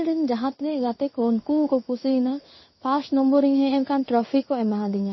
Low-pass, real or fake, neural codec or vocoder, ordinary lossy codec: 7.2 kHz; fake; codec, 16 kHz in and 24 kHz out, 1 kbps, XY-Tokenizer; MP3, 24 kbps